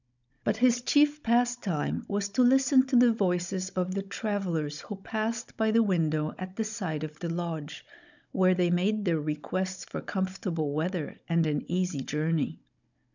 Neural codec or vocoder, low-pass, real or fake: codec, 16 kHz, 16 kbps, FunCodec, trained on Chinese and English, 50 frames a second; 7.2 kHz; fake